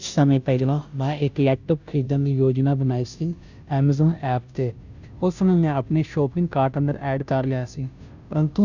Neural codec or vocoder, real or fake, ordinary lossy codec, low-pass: codec, 16 kHz, 0.5 kbps, FunCodec, trained on Chinese and English, 25 frames a second; fake; none; 7.2 kHz